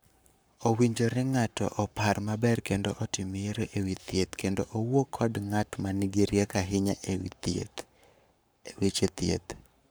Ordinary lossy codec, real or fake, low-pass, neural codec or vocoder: none; fake; none; codec, 44.1 kHz, 7.8 kbps, Pupu-Codec